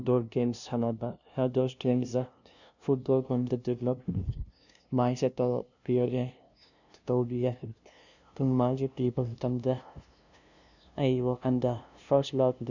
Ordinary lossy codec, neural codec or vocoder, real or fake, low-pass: none; codec, 16 kHz, 0.5 kbps, FunCodec, trained on LibriTTS, 25 frames a second; fake; 7.2 kHz